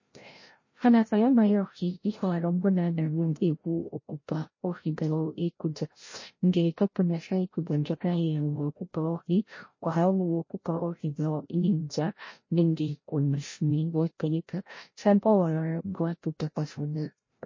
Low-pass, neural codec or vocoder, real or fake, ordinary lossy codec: 7.2 kHz; codec, 16 kHz, 0.5 kbps, FreqCodec, larger model; fake; MP3, 32 kbps